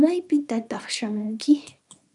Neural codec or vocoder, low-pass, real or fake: codec, 24 kHz, 0.9 kbps, WavTokenizer, small release; 10.8 kHz; fake